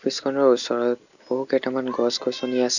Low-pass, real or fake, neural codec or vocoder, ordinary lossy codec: 7.2 kHz; real; none; none